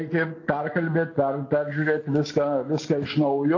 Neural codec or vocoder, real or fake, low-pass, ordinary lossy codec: none; real; 7.2 kHz; AAC, 48 kbps